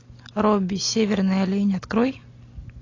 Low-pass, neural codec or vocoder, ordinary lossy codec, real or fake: 7.2 kHz; none; AAC, 32 kbps; real